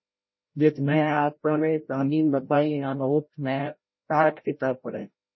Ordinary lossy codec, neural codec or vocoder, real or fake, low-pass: MP3, 24 kbps; codec, 16 kHz, 0.5 kbps, FreqCodec, larger model; fake; 7.2 kHz